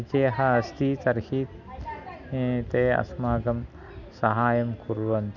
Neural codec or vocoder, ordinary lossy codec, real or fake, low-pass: none; none; real; 7.2 kHz